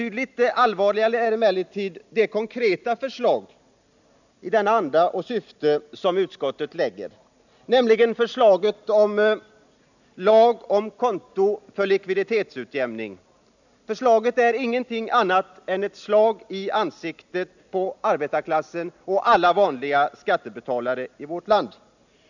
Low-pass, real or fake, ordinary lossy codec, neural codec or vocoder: 7.2 kHz; real; none; none